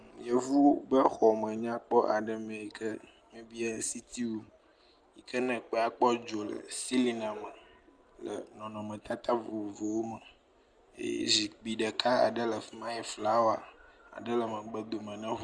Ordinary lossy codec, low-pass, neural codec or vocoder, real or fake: Opus, 32 kbps; 9.9 kHz; none; real